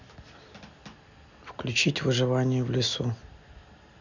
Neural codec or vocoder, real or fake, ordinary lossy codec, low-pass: none; real; none; 7.2 kHz